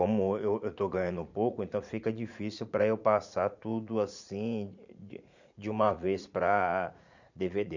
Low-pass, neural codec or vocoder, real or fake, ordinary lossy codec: 7.2 kHz; vocoder, 44.1 kHz, 80 mel bands, Vocos; fake; none